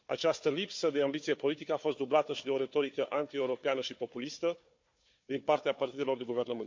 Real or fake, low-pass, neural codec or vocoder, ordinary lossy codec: fake; 7.2 kHz; codec, 16 kHz, 4 kbps, FunCodec, trained on Chinese and English, 50 frames a second; MP3, 48 kbps